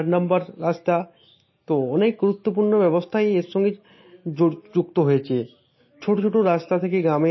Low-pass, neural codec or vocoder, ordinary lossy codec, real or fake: 7.2 kHz; none; MP3, 24 kbps; real